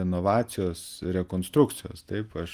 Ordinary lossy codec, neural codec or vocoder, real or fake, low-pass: Opus, 32 kbps; none; real; 14.4 kHz